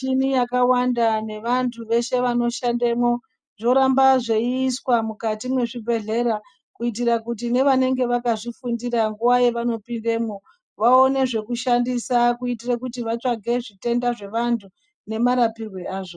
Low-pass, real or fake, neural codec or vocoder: 9.9 kHz; real; none